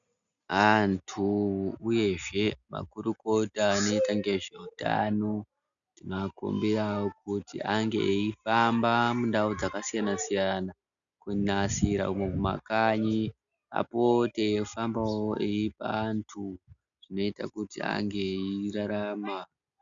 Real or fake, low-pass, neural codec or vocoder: real; 7.2 kHz; none